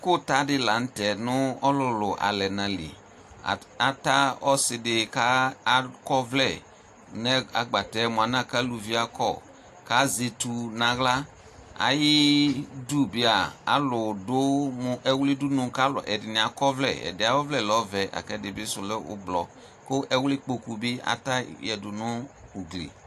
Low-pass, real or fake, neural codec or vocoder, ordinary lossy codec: 14.4 kHz; real; none; AAC, 64 kbps